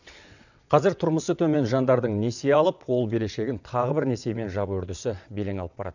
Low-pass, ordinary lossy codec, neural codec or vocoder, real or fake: 7.2 kHz; none; vocoder, 22.05 kHz, 80 mel bands, WaveNeXt; fake